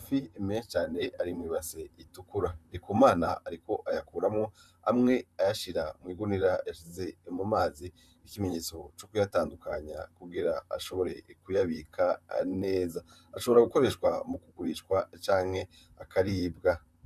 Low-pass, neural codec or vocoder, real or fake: 14.4 kHz; vocoder, 44.1 kHz, 128 mel bands, Pupu-Vocoder; fake